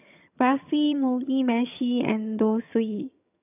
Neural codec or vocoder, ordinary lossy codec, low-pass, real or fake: vocoder, 22.05 kHz, 80 mel bands, HiFi-GAN; none; 3.6 kHz; fake